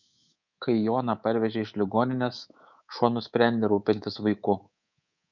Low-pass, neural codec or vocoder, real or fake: 7.2 kHz; codec, 24 kHz, 3.1 kbps, DualCodec; fake